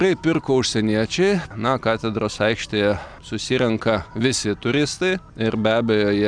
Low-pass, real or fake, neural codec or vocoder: 9.9 kHz; real; none